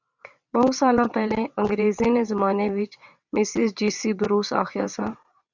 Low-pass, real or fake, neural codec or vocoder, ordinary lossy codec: 7.2 kHz; fake; vocoder, 22.05 kHz, 80 mel bands, WaveNeXt; Opus, 64 kbps